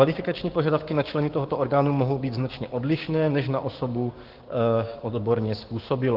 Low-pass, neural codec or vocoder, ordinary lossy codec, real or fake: 5.4 kHz; codec, 16 kHz, 6 kbps, DAC; Opus, 16 kbps; fake